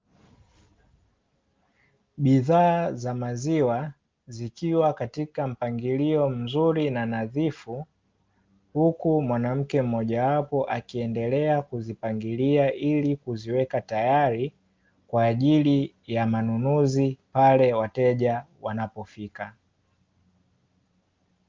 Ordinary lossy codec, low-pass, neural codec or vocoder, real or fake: Opus, 32 kbps; 7.2 kHz; none; real